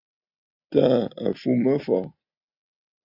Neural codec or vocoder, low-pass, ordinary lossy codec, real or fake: none; 5.4 kHz; AAC, 48 kbps; real